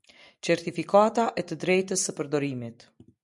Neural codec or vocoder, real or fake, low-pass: none; real; 10.8 kHz